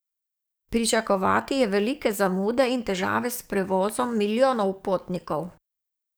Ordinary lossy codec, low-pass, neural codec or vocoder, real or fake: none; none; codec, 44.1 kHz, 7.8 kbps, DAC; fake